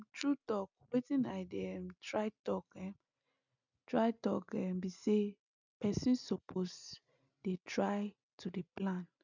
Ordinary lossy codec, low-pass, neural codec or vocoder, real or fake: none; 7.2 kHz; none; real